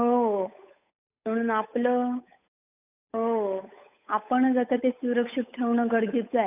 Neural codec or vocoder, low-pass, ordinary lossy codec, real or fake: codec, 16 kHz, 8 kbps, FunCodec, trained on Chinese and English, 25 frames a second; 3.6 kHz; none; fake